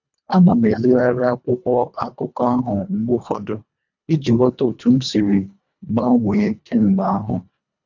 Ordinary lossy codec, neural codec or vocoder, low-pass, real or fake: none; codec, 24 kHz, 1.5 kbps, HILCodec; 7.2 kHz; fake